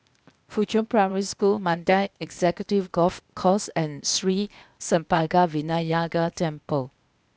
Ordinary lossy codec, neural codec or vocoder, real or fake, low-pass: none; codec, 16 kHz, 0.8 kbps, ZipCodec; fake; none